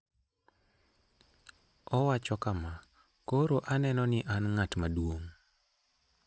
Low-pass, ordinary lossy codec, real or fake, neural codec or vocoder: none; none; real; none